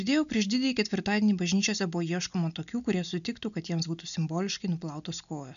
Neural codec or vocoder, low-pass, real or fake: none; 7.2 kHz; real